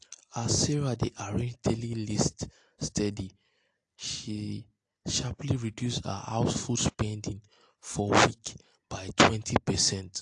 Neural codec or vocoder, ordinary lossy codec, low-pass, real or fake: none; AAC, 48 kbps; 10.8 kHz; real